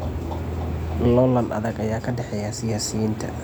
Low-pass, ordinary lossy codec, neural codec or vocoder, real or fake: none; none; none; real